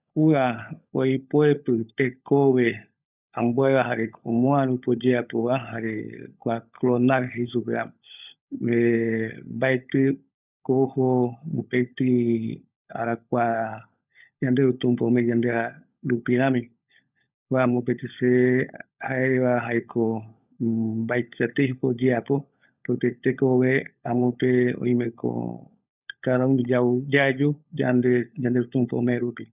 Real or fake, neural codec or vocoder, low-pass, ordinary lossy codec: fake; codec, 16 kHz, 16 kbps, FunCodec, trained on LibriTTS, 50 frames a second; 3.6 kHz; none